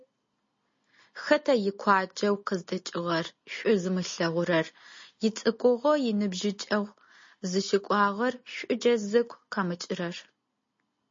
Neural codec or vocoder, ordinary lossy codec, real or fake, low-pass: none; MP3, 32 kbps; real; 7.2 kHz